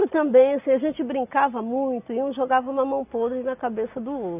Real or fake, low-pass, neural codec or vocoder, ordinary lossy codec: real; 3.6 kHz; none; none